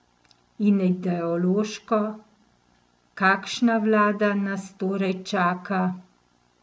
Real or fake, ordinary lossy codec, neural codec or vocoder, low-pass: real; none; none; none